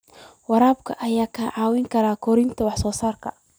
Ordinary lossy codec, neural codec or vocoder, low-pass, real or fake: none; none; none; real